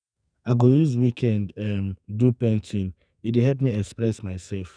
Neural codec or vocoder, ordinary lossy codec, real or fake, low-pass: codec, 44.1 kHz, 2.6 kbps, SNAC; none; fake; 9.9 kHz